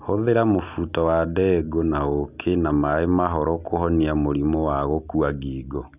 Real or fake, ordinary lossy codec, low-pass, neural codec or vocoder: real; none; 3.6 kHz; none